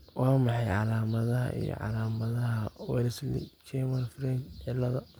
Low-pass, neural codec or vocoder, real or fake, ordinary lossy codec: none; none; real; none